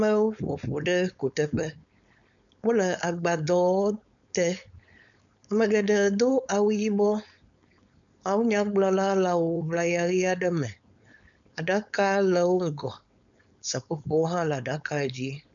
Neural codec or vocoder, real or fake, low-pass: codec, 16 kHz, 4.8 kbps, FACodec; fake; 7.2 kHz